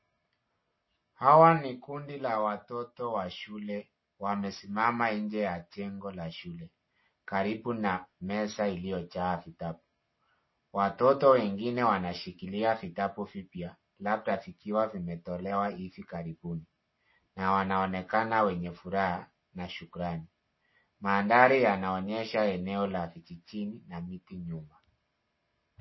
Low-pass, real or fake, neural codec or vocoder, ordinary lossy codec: 7.2 kHz; real; none; MP3, 24 kbps